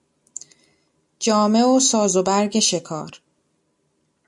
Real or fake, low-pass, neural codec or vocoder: real; 10.8 kHz; none